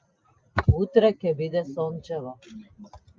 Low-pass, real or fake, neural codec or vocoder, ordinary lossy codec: 7.2 kHz; real; none; Opus, 24 kbps